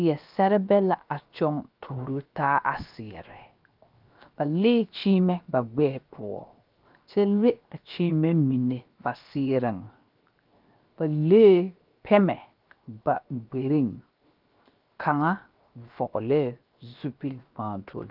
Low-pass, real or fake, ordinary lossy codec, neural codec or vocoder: 5.4 kHz; fake; Opus, 24 kbps; codec, 16 kHz, 0.7 kbps, FocalCodec